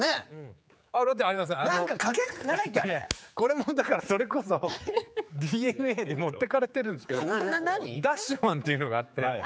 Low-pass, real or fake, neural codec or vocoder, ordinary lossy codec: none; fake; codec, 16 kHz, 4 kbps, X-Codec, HuBERT features, trained on general audio; none